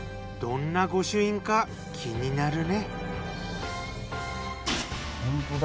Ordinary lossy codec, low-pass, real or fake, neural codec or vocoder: none; none; real; none